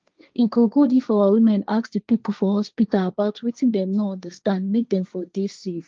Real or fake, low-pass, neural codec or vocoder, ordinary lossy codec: fake; 7.2 kHz; codec, 16 kHz, 1.1 kbps, Voila-Tokenizer; Opus, 24 kbps